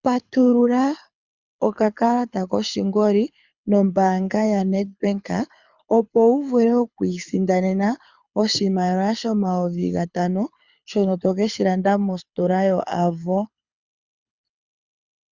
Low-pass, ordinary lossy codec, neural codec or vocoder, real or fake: 7.2 kHz; Opus, 64 kbps; codec, 24 kHz, 6 kbps, HILCodec; fake